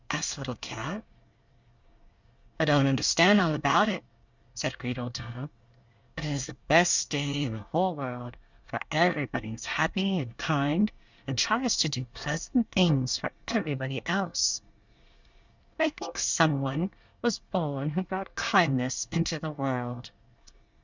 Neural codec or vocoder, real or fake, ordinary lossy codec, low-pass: codec, 24 kHz, 1 kbps, SNAC; fake; Opus, 64 kbps; 7.2 kHz